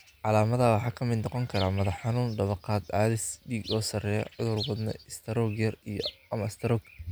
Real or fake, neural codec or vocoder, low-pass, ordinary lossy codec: real; none; none; none